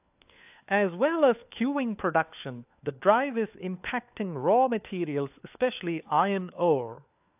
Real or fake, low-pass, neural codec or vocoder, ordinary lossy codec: fake; 3.6 kHz; codec, 16 kHz, 4 kbps, FunCodec, trained on LibriTTS, 50 frames a second; AAC, 32 kbps